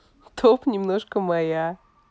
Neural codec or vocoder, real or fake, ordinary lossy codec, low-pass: none; real; none; none